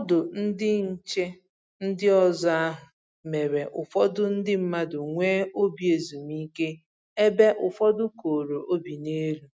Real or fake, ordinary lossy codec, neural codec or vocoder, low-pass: real; none; none; none